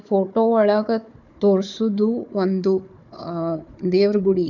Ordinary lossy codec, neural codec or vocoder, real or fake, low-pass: none; codec, 16 kHz, 4 kbps, FunCodec, trained on Chinese and English, 50 frames a second; fake; 7.2 kHz